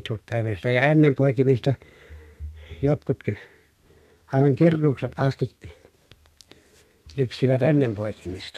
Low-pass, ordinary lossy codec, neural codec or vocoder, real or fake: 14.4 kHz; none; codec, 32 kHz, 1.9 kbps, SNAC; fake